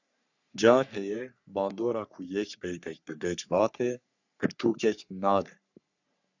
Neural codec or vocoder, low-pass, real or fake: codec, 44.1 kHz, 3.4 kbps, Pupu-Codec; 7.2 kHz; fake